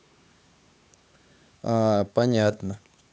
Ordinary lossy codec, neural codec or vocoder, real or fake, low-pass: none; codec, 16 kHz, 4 kbps, X-Codec, HuBERT features, trained on LibriSpeech; fake; none